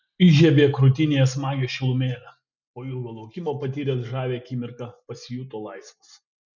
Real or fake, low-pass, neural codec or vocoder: real; 7.2 kHz; none